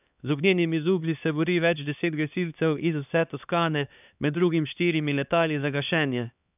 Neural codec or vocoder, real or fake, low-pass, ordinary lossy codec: codec, 16 kHz, 2 kbps, X-Codec, HuBERT features, trained on LibriSpeech; fake; 3.6 kHz; none